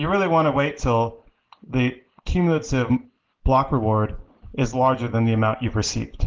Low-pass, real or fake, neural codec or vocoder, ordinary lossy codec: 7.2 kHz; real; none; Opus, 16 kbps